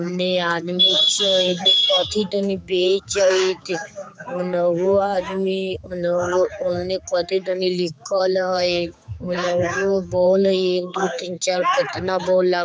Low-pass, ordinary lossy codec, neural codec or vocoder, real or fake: none; none; codec, 16 kHz, 4 kbps, X-Codec, HuBERT features, trained on general audio; fake